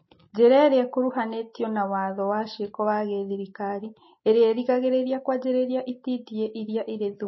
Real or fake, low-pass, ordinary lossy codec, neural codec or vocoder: real; 7.2 kHz; MP3, 24 kbps; none